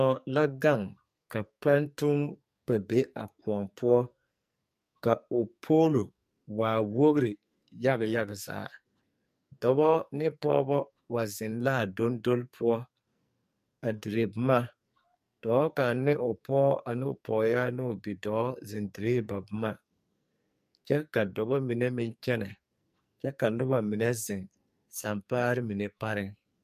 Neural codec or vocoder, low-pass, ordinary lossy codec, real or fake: codec, 44.1 kHz, 2.6 kbps, SNAC; 14.4 kHz; MP3, 64 kbps; fake